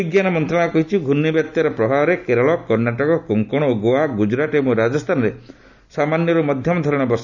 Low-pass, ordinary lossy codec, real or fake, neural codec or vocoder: 7.2 kHz; none; real; none